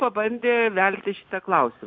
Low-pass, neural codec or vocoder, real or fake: 7.2 kHz; none; real